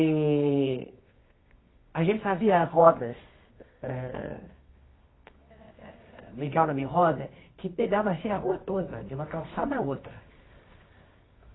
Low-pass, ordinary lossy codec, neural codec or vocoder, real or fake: 7.2 kHz; AAC, 16 kbps; codec, 24 kHz, 0.9 kbps, WavTokenizer, medium music audio release; fake